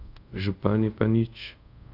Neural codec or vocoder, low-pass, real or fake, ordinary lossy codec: codec, 24 kHz, 0.5 kbps, DualCodec; 5.4 kHz; fake; none